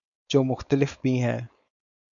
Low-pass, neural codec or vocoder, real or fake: 7.2 kHz; codec, 16 kHz, 4.8 kbps, FACodec; fake